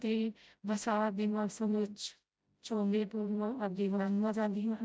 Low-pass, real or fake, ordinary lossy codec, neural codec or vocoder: none; fake; none; codec, 16 kHz, 0.5 kbps, FreqCodec, smaller model